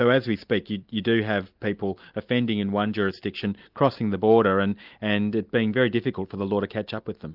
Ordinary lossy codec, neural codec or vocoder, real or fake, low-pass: Opus, 24 kbps; none; real; 5.4 kHz